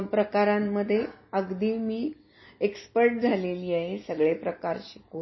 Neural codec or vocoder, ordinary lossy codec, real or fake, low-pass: none; MP3, 24 kbps; real; 7.2 kHz